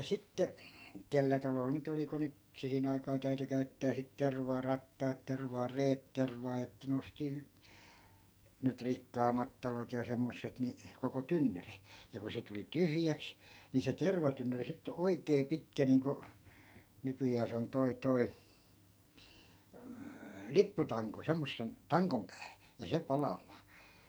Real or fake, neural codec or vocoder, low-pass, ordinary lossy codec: fake; codec, 44.1 kHz, 2.6 kbps, SNAC; none; none